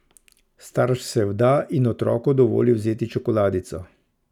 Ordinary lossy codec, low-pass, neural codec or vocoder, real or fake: none; 19.8 kHz; none; real